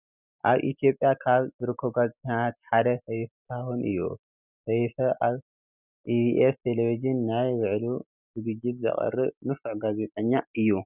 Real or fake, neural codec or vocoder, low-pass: real; none; 3.6 kHz